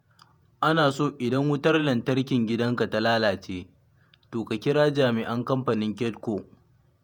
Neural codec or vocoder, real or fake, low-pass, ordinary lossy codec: vocoder, 48 kHz, 128 mel bands, Vocos; fake; none; none